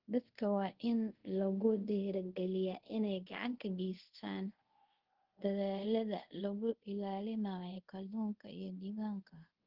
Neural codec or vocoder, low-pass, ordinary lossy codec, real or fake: codec, 24 kHz, 0.5 kbps, DualCodec; 5.4 kHz; Opus, 16 kbps; fake